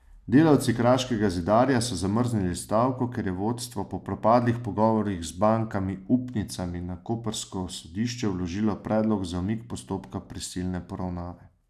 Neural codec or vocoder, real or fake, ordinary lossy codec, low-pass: none; real; none; 14.4 kHz